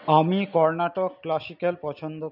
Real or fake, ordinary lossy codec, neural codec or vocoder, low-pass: real; none; none; 5.4 kHz